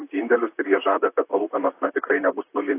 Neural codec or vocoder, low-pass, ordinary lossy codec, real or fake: vocoder, 44.1 kHz, 128 mel bands, Pupu-Vocoder; 3.6 kHz; AAC, 24 kbps; fake